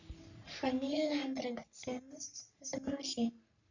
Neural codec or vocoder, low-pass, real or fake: codec, 44.1 kHz, 3.4 kbps, Pupu-Codec; 7.2 kHz; fake